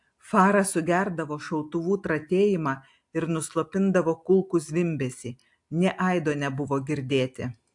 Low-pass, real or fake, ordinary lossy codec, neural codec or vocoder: 10.8 kHz; real; AAC, 64 kbps; none